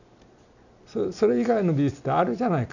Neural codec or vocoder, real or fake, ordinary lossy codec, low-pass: none; real; none; 7.2 kHz